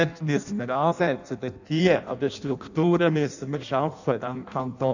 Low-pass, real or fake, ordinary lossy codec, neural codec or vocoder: 7.2 kHz; fake; none; codec, 16 kHz in and 24 kHz out, 0.6 kbps, FireRedTTS-2 codec